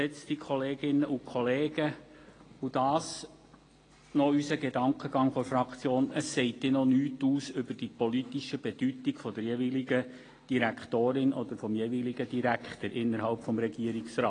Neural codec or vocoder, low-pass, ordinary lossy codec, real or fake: none; 9.9 kHz; AAC, 32 kbps; real